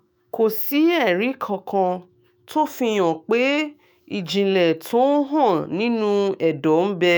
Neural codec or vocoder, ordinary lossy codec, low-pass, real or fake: autoencoder, 48 kHz, 128 numbers a frame, DAC-VAE, trained on Japanese speech; none; none; fake